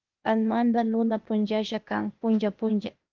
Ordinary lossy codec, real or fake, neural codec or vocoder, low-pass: Opus, 24 kbps; fake; codec, 16 kHz, 0.8 kbps, ZipCodec; 7.2 kHz